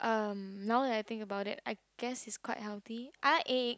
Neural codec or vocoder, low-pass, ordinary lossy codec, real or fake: none; none; none; real